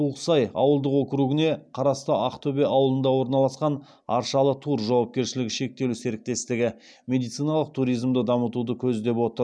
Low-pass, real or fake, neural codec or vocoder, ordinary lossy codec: none; real; none; none